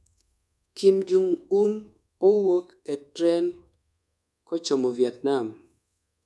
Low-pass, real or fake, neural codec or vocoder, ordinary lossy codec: none; fake; codec, 24 kHz, 1.2 kbps, DualCodec; none